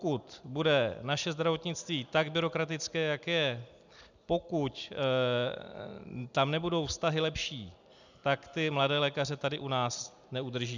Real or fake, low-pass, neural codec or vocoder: real; 7.2 kHz; none